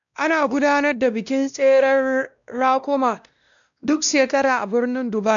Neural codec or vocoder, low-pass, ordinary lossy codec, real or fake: codec, 16 kHz, 1 kbps, X-Codec, WavLM features, trained on Multilingual LibriSpeech; 7.2 kHz; none; fake